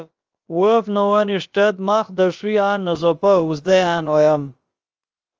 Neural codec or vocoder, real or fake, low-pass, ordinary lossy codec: codec, 16 kHz, about 1 kbps, DyCAST, with the encoder's durations; fake; 7.2 kHz; Opus, 24 kbps